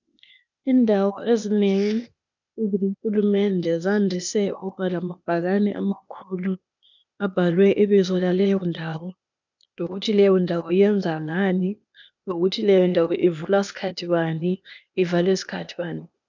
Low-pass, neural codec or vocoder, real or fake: 7.2 kHz; codec, 16 kHz, 0.8 kbps, ZipCodec; fake